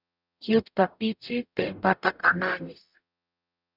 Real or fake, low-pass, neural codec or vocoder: fake; 5.4 kHz; codec, 44.1 kHz, 0.9 kbps, DAC